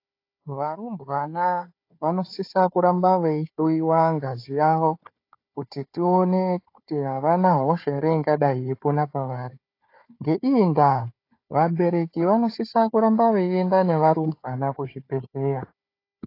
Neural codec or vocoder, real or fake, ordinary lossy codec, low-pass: codec, 16 kHz, 4 kbps, FunCodec, trained on Chinese and English, 50 frames a second; fake; AAC, 32 kbps; 5.4 kHz